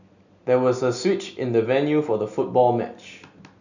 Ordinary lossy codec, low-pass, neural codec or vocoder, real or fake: none; 7.2 kHz; none; real